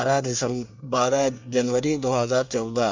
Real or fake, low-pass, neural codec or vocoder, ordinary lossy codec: fake; 7.2 kHz; codec, 24 kHz, 1 kbps, SNAC; MP3, 64 kbps